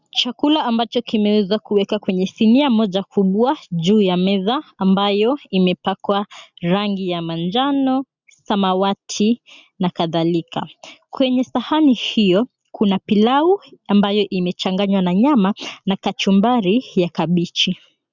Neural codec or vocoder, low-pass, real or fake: none; 7.2 kHz; real